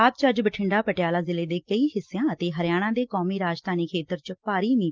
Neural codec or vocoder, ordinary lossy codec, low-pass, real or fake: none; Opus, 32 kbps; 7.2 kHz; real